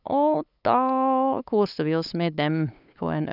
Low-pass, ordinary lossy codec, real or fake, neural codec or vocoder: 5.4 kHz; none; fake; codec, 16 kHz, 8 kbps, FunCodec, trained on LibriTTS, 25 frames a second